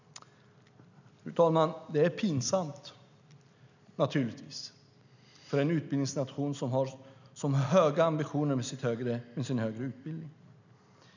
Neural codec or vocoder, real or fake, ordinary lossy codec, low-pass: none; real; none; 7.2 kHz